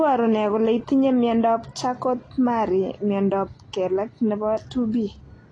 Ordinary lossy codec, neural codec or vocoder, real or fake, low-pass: AAC, 32 kbps; none; real; 9.9 kHz